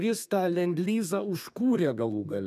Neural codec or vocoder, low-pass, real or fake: codec, 32 kHz, 1.9 kbps, SNAC; 14.4 kHz; fake